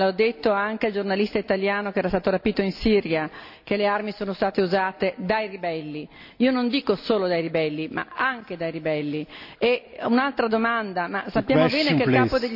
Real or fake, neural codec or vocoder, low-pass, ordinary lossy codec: real; none; 5.4 kHz; none